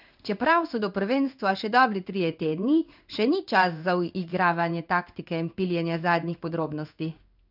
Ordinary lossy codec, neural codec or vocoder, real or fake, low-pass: none; codec, 16 kHz in and 24 kHz out, 1 kbps, XY-Tokenizer; fake; 5.4 kHz